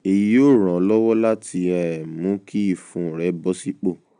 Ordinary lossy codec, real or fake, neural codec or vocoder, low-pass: none; real; none; 9.9 kHz